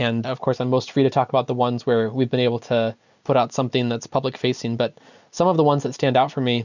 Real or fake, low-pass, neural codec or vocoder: real; 7.2 kHz; none